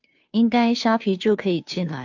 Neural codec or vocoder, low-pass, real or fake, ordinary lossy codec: codec, 16 kHz, 2 kbps, FunCodec, trained on Chinese and English, 25 frames a second; 7.2 kHz; fake; AAC, 48 kbps